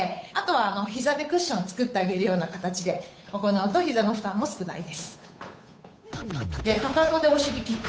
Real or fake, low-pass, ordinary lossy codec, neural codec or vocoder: fake; none; none; codec, 16 kHz, 8 kbps, FunCodec, trained on Chinese and English, 25 frames a second